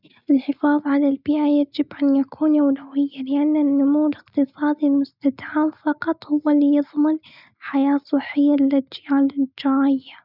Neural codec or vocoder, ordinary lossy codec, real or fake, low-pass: none; none; real; 5.4 kHz